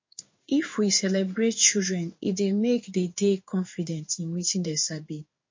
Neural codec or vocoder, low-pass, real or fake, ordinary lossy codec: codec, 16 kHz in and 24 kHz out, 1 kbps, XY-Tokenizer; 7.2 kHz; fake; MP3, 32 kbps